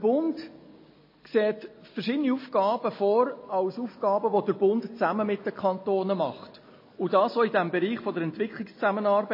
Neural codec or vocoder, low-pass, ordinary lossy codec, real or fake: none; 5.4 kHz; MP3, 24 kbps; real